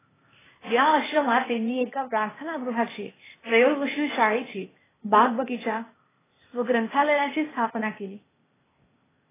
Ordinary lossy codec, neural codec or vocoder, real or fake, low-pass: AAC, 16 kbps; codec, 16 kHz, 0.8 kbps, ZipCodec; fake; 3.6 kHz